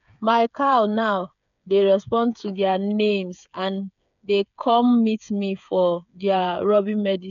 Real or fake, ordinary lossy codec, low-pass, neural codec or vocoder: fake; none; 7.2 kHz; codec, 16 kHz, 8 kbps, FreqCodec, smaller model